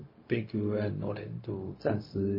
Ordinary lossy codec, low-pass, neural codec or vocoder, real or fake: MP3, 24 kbps; 5.4 kHz; codec, 16 kHz, 0.4 kbps, LongCat-Audio-Codec; fake